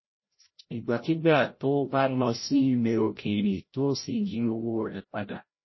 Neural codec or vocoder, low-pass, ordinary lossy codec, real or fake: codec, 16 kHz, 0.5 kbps, FreqCodec, larger model; 7.2 kHz; MP3, 24 kbps; fake